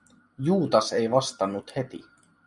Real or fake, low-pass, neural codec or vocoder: real; 10.8 kHz; none